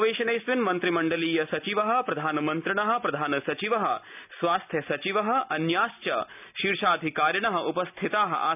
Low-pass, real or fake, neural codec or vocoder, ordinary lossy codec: 3.6 kHz; real; none; none